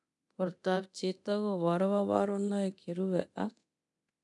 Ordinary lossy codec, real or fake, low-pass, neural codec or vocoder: none; fake; 10.8 kHz; codec, 24 kHz, 0.9 kbps, DualCodec